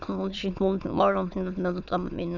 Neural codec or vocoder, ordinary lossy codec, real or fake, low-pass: autoencoder, 22.05 kHz, a latent of 192 numbers a frame, VITS, trained on many speakers; none; fake; 7.2 kHz